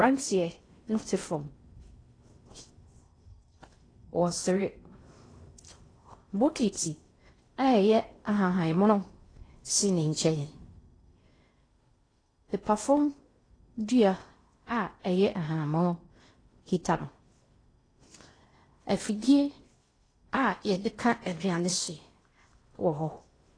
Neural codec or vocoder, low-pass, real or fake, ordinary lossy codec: codec, 16 kHz in and 24 kHz out, 0.6 kbps, FocalCodec, streaming, 4096 codes; 9.9 kHz; fake; AAC, 32 kbps